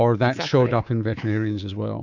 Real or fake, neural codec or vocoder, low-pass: fake; codec, 24 kHz, 3.1 kbps, DualCodec; 7.2 kHz